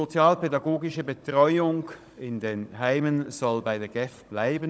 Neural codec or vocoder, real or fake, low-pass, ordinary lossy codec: codec, 16 kHz, 16 kbps, FunCodec, trained on Chinese and English, 50 frames a second; fake; none; none